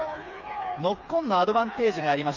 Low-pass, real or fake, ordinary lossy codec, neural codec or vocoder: 7.2 kHz; fake; none; codec, 16 kHz, 4 kbps, FreqCodec, smaller model